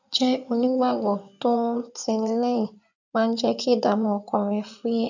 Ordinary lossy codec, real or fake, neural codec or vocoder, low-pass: none; fake; codec, 16 kHz in and 24 kHz out, 2.2 kbps, FireRedTTS-2 codec; 7.2 kHz